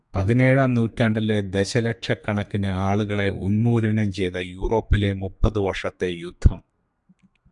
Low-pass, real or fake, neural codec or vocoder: 10.8 kHz; fake; codec, 32 kHz, 1.9 kbps, SNAC